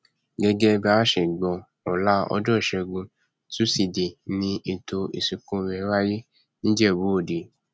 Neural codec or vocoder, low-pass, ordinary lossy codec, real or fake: none; none; none; real